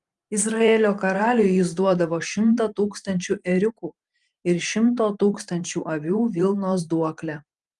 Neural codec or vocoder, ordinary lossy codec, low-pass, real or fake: vocoder, 44.1 kHz, 128 mel bands every 512 samples, BigVGAN v2; Opus, 32 kbps; 10.8 kHz; fake